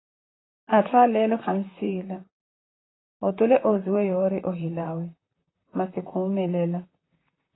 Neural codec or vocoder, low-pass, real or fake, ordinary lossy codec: vocoder, 44.1 kHz, 128 mel bands, Pupu-Vocoder; 7.2 kHz; fake; AAC, 16 kbps